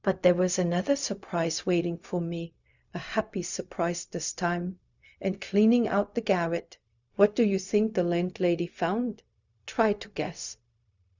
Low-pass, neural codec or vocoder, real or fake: 7.2 kHz; codec, 16 kHz, 0.4 kbps, LongCat-Audio-Codec; fake